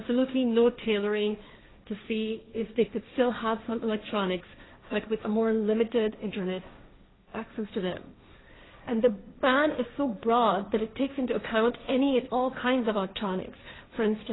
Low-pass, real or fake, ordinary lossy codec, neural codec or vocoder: 7.2 kHz; fake; AAC, 16 kbps; codec, 16 kHz, 1.1 kbps, Voila-Tokenizer